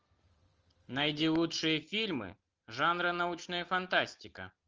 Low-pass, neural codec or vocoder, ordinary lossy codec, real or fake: 7.2 kHz; none; Opus, 24 kbps; real